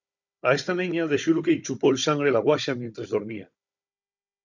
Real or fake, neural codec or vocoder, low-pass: fake; codec, 16 kHz, 16 kbps, FunCodec, trained on Chinese and English, 50 frames a second; 7.2 kHz